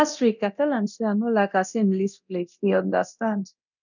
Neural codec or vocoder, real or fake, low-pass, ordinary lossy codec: codec, 24 kHz, 0.9 kbps, DualCodec; fake; 7.2 kHz; none